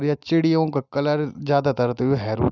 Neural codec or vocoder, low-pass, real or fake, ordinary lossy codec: none; 7.2 kHz; real; none